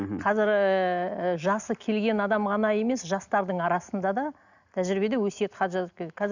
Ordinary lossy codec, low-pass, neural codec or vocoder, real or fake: none; 7.2 kHz; none; real